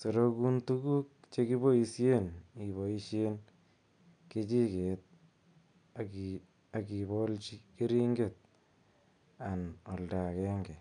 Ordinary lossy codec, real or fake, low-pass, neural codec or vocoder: none; real; 9.9 kHz; none